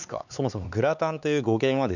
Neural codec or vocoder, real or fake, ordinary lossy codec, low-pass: codec, 16 kHz, 4 kbps, X-Codec, HuBERT features, trained on LibriSpeech; fake; none; 7.2 kHz